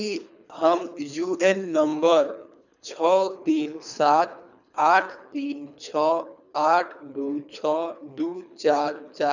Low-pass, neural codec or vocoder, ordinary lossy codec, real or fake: 7.2 kHz; codec, 24 kHz, 3 kbps, HILCodec; none; fake